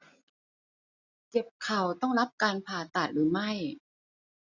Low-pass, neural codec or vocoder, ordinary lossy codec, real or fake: 7.2 kHz; none; none; real